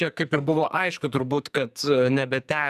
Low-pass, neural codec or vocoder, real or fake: 14.4 kHz; codec, 44.1 kHz, 2.6 kbps, SNAC; fake